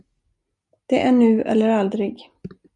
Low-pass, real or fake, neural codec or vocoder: 10.8 kHz; real; none